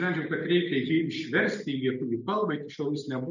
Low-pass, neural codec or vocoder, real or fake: 7.2 kHz; none; real